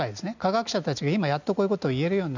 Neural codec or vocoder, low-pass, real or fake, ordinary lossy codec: none; 7.2 kHz; real; none